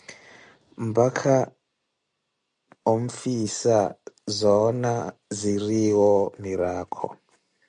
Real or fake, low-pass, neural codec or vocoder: real; 9.9 kHz; none